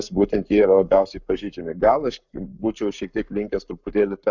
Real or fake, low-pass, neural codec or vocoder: fake; 7.2 kHz; codec, 44.1 kHz, 7.8 kbps, Pupu-Codec